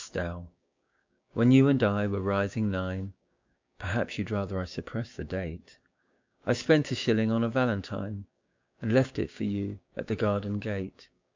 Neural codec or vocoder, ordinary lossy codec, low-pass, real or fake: codec, 16 kHz, 6 kbps, DAC; MP3, 64 kbps; 7.2 kHz; fake